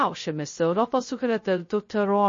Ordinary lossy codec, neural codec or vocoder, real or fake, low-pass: MP3, 32 kbps; codec, 16 kHz, 0.2 kbps, FocalCodec; fake; 7.2 kHz